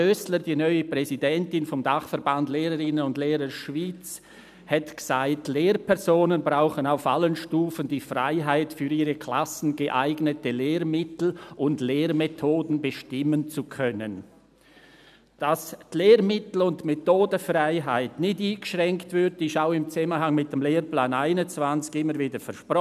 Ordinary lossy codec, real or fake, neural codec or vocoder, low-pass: none; real; none; 14.4 kHz